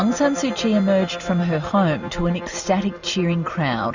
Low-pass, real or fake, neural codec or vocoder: 7.2 kHz; real; none